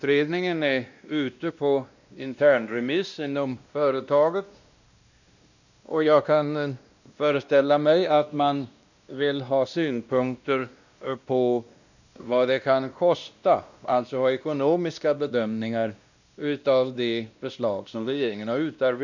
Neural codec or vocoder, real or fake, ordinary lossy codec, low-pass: codec, 16 kHz, 1 kbps, X-Codec, WavLM features, trained on Multilingual LibriSpeech; fake; none; 7.2 kHz